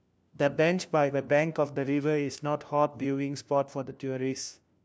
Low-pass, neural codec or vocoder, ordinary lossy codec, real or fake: none; codec, 16 kHz, 1 kbps, FunCodec, trained on LibriTTS, 50 frames a second; none; fake